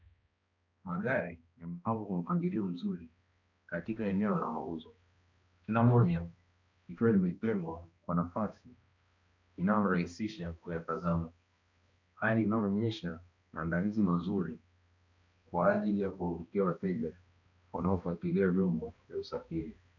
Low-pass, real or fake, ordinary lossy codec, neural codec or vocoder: 7.2 kHz; fake; MP3, 64 kbps; codec, 16 kHz, 1 kbps, X-Codec, HuBERT features, trained on balanced general audio